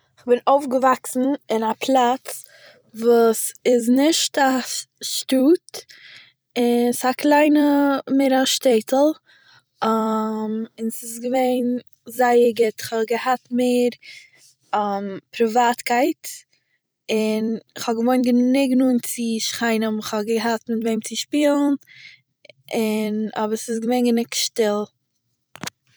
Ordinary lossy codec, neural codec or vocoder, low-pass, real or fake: none; vocoder, 44.1 kHz, 128 mel bands every 256 samples, BigVGAN v2; none; fake